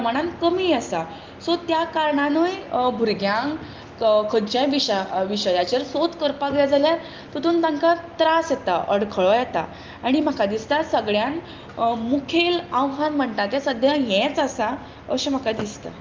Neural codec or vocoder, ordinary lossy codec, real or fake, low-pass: none; Opus, 24 kbps; real; 7.2 kHz